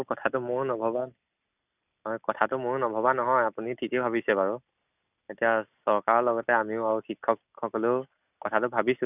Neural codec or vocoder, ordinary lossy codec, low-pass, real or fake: none; none; 3.6 kHz; real